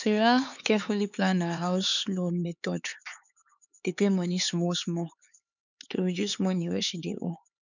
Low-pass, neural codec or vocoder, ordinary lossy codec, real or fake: 7.2 kHz; codec, 16 kHz, 4 kbps, X-Codec, HuBERT features, trained on LibriSpeech; none; fake